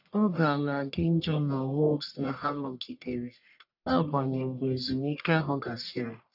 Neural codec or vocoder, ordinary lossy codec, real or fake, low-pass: codec, 44.1 kHz, 1.7 kbps, Pupu-Codec; none; fake; 5.4 kHz